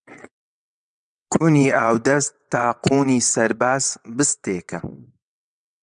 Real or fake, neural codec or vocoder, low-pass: fake; vocoder, 22.05 kHz, 80 mel bands, WaveNeXt; 9.9 kHz